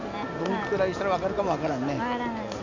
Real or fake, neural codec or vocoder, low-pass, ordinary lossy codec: real; none; 7.2 kHz; none